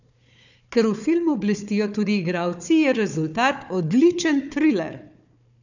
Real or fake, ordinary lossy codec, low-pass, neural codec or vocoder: fake; none; 7.2 kHz; codec, 16 kHz, 4 kbps, FunCodec, trained on Chinese and English, 50 frames a second